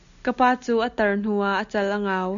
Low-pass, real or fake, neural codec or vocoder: 7.2 kHz; real; none